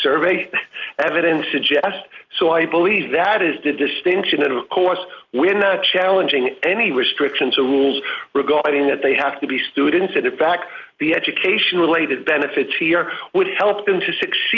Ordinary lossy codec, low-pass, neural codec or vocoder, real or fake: Opus, 24 kbps; 7.2 kHz; none; real